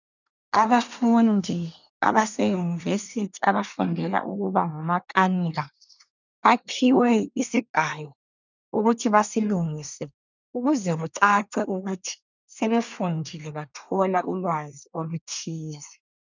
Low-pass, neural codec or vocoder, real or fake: 7.2 kHz; codec, 24 kHz, 1 kbps, SNAC; fake